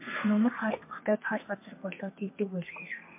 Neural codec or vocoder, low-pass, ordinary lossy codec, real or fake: codec, 32 kHz, 1.9 kbps, SNAC; 3.6 kHz; MP3, 24 kbps; fake